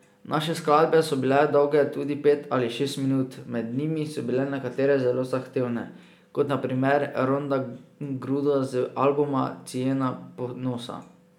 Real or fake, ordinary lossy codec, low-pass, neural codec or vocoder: real; none; 19.8 kHz; none